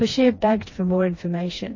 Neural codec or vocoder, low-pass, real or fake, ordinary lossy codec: codec, 16 kHz, 2 kbps, FreqCodec, smaller model; 7.2 kHz; fake; MP3, 32 kbps